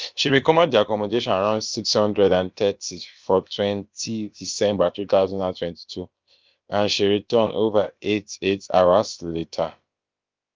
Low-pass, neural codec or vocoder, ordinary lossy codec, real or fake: 7.2 kHz; codec, 16 kHz, about 1 kbps, DyCAST, with the encoder's durations; Opus, 32 kbps; fake